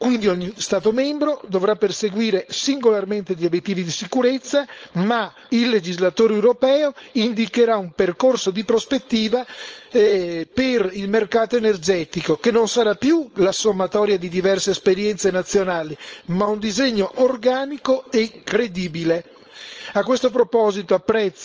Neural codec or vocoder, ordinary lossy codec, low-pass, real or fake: codec, 16 kHz, 4.8 kbps, FACodec; Opus, 24 kbps; 7.2 kHz; fake